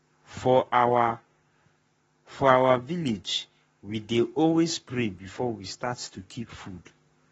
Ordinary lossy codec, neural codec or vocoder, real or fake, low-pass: AAC, 24 kbps; codec, 44.1 kHz, 7.8 kbps, Pupu-Codec; fake; 19.8 kHz